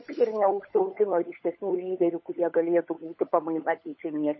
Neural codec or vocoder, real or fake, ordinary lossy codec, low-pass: codec, 16 kHz, 16 kbps, FunCodec, trained on LibriTTS, 50 frames a second; fake; MP3, 24 kbps; 7.2 kHz